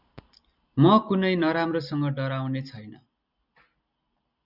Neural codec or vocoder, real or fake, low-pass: none; real; 5.4 kHz